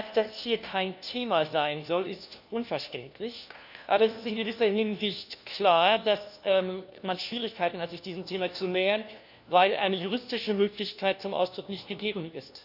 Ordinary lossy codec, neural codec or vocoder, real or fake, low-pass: none; codec, 16 kHz, 1 kbps, FunCodec, trained on LibriTTS, 50 frames a second; fake; 5.4 kHz